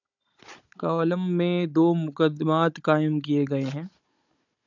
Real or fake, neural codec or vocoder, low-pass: fake; codec, 16 kHz, 16 kbps, FunCodec, trained on Chinese and English, 50 frames a second; 7.2 kHz